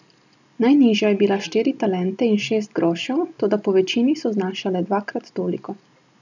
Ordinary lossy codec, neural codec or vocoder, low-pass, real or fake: none; none; 7.2 kHz; real